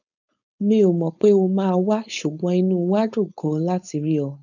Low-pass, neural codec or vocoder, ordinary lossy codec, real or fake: 7.2 kHz; codec, 16 kHz, 4.8 kbps, FACodec; none; fake